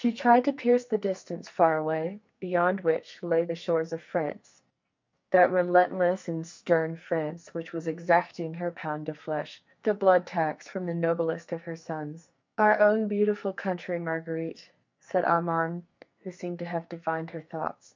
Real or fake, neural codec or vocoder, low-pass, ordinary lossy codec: fake; codec, 44.1 kHz, 2.6 kbps, SNAC; 7.2 kHz; MP3, 64 kbps